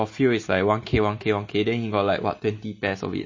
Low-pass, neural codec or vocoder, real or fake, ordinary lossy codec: 7.2 kHz; none; real; MP3, 32 kbps